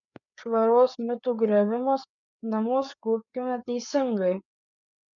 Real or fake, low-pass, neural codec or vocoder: fake; 7.2 kHz; codec, 16 kHz, 4 kbps, FreqCodec, larger model